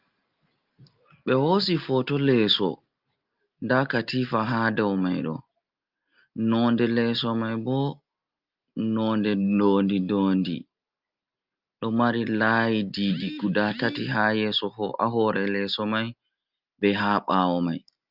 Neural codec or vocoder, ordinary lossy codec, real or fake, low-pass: none; Opus, 24 kbps; real; 5.4 kHz